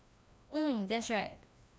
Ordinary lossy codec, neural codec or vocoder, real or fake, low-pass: none; codec, 16 kHz, 1 kbps, FreqCodec, larger model; fake; none